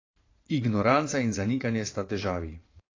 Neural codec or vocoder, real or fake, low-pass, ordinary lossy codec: vocoder, 22.05 kHz, 80 mel bands, Vocos; fake; 7.2 kHz; AAC, 32 kbps